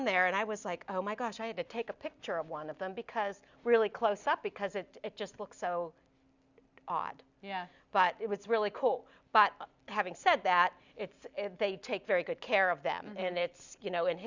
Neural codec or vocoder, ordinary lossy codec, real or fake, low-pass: none; Opus, 64 kbps; real; 7.2 kHz